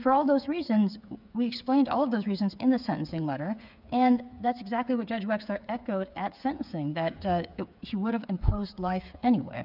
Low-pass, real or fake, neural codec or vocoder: 5.4 kHz; fake; codec, 16 kHz, 8 kbps, FreqCodec, smaller model